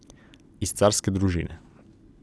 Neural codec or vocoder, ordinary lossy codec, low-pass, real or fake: none; none; none; real